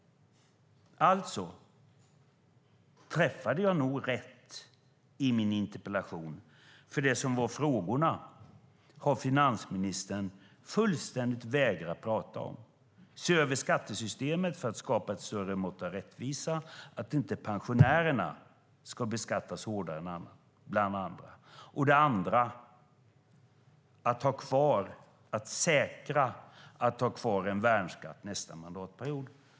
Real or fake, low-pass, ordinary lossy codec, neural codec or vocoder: real; none; none; none